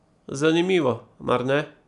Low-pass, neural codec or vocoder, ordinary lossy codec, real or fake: 10.8 kHz; none; none; real